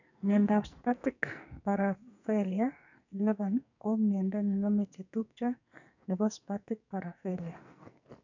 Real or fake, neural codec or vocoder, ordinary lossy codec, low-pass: fake; codec, 44.1 kHz, 2.6 kbps, SNAC; none; 7.2 kHz